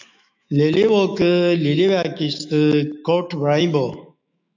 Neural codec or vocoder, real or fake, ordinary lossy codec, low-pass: autoencoder, 48 kHz, 128 numbers a frame, DAC-VAE, trained on Japanese speech; fake; MP3, 64 kbps; 7.2 kHz